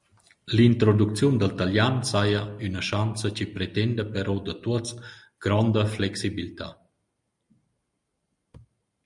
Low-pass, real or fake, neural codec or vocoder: 10.8 kHz; real; none